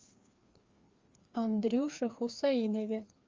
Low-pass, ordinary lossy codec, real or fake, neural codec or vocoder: 7.2 kHz; Opus, 32 kbps; fake; codec, 16 kHz, 2 kbps, FreqCodec, larger model